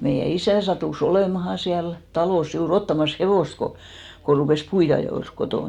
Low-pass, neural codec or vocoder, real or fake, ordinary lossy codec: 19.8 kHz; none; real; none